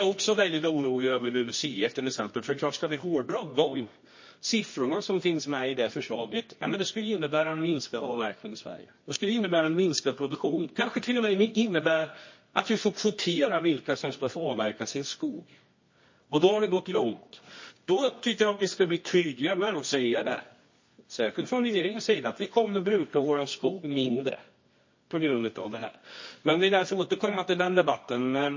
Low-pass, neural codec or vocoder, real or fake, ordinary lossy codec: 7.2 kHz; codec, 24 kHz, 0.9 kbps, WavTokenizer, medium music audio release; fake; MP3, 32 kbps